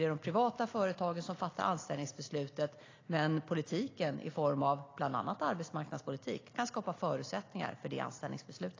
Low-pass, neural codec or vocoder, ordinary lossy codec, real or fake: 7.2 kHz; none; AAC, 32 kbps; real